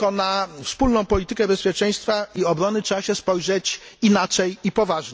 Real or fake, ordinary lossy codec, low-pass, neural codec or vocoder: real; none; none; none